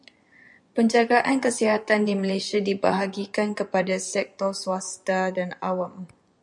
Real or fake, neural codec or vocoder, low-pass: fake; vocoder, 24 kHz, 100 mel bands, Vocos; 10.8 kHz